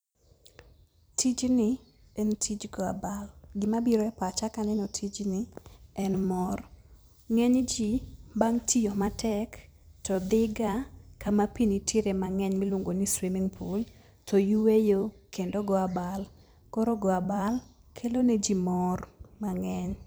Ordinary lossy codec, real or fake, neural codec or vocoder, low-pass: none; fake; vocoder, 44.1 kHz, 128 mel bands every 256 samples, BigVGAN v2; none